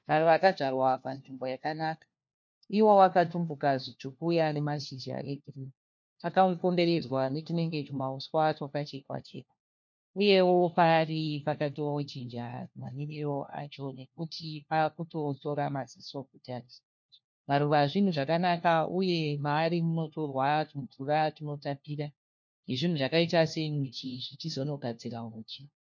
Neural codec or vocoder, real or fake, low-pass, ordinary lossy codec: codec, 16 kHz, 1 kbps, FunCodec, trained on LibriTTS, 50 frames a second; fake; 7.2 kHz; MP3, 48 kbps